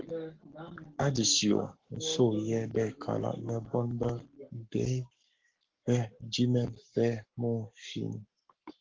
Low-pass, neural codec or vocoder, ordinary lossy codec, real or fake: 7.2 kHz; codec, 44.1 kHz, 7.8 kbps, Pupu-Codec; Opus, 16 kbps; fake